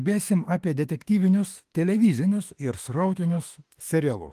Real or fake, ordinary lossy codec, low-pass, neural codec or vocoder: fake; Opus, 24 kbps; 14.4 kHz; autoencoder, 48 kHz, 32 numbers a frame, DAC-VAE, trained on Japanese speech